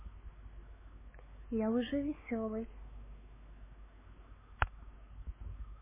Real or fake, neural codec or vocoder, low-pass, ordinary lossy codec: fake; codec, 16 kHz, 4 kbps, X-Codec, WavLM features, trained on Multilingual LibriSpeech; 3.6 kHz; MP3, 16 kbps